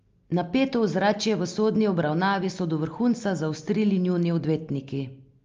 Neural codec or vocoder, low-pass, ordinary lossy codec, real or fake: none; 7.2 kHz; Opus, 32 kbps; real